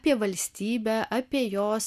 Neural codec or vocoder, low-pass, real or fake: none; 14.4 kHz; real